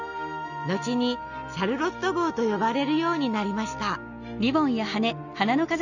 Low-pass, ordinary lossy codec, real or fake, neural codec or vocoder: 7.2 kHz; none; real; none